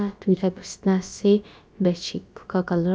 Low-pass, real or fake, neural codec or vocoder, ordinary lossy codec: none; fake; codec, 16 kHz, about 1 kbps, DyCAST, with the encoder's durations; none